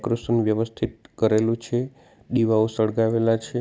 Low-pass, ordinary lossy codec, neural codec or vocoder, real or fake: none; none; none; real